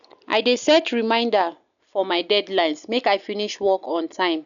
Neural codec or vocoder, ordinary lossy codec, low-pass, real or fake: none; none; 7.2 kHz; real